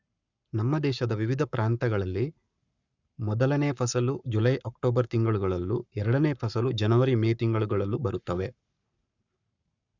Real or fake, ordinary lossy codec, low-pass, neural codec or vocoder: fake; none; 7.2 kHz; codec, 44.1 kHz, 7.8 kbps, Pupu-Codec